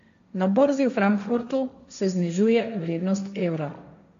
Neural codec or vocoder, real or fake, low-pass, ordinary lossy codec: codec, 16 kHz, 1.1 kbps, Voila-Tokenizer; fake; 7.2 kHz; MP3, 64 kbps